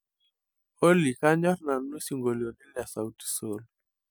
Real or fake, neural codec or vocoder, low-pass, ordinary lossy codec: real; none; none; none